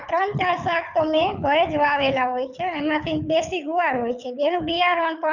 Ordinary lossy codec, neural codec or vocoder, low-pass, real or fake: none; codec, 24 kHz, 6 kbps, HILCodec; 7.2 kHz; fake